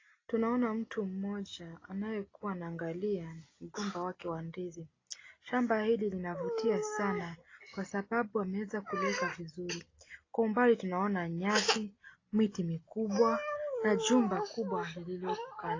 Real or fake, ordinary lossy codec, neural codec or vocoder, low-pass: real; AAC, 32 kbps; none; 7.2 kHz